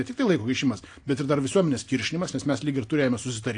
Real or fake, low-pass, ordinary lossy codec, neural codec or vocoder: real; 9.9 kHz; AAC, 48 kbps; none